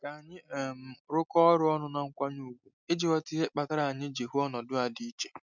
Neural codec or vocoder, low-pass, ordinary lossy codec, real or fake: none; 7.2 kHz; none; real